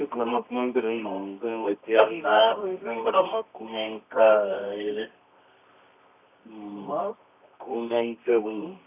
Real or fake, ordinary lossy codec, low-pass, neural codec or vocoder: fake; none; 3.6 kHz; codec, 24 kHz, 0.9 kbps, WavTokenizer, medium music audio release